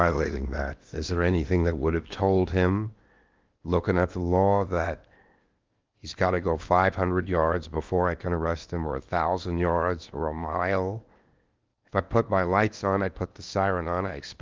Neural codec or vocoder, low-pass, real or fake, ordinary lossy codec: codec, 16 kHz in and 24 kHz out, 0.8 kbps, FocalCodec, streaming, 65536 codes; 7.2 kHz; fake; Opus, 32 kbps